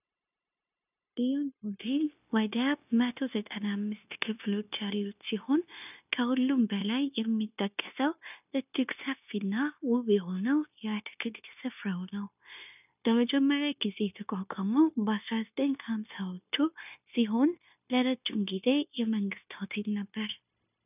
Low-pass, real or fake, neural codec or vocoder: 3.6 kHz; fake; codec, 16 kHz, 0.9 kbps, LongCat-Audio-Codec